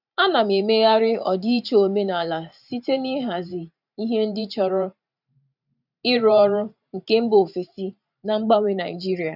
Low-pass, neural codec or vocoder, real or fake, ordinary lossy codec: 5.4 kHz; vocoder, 44.1 kHz, 128 mel bands every 512 samples, BigVGAN v2; fake; none